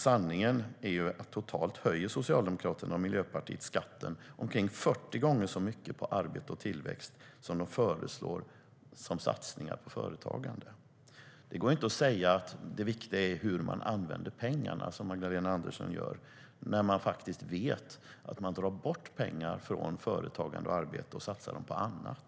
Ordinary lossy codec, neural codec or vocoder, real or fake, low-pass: none; none; real; none